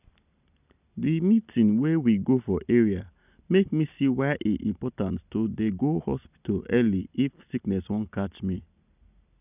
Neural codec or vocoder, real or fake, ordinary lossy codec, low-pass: none; real; none; 3.6 kHz